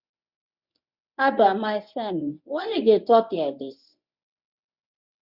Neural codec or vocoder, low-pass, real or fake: codec, 24 kHz, 0.9 kbps, WavTokenizer, medium speech release version 1; 5.4 kHz; fake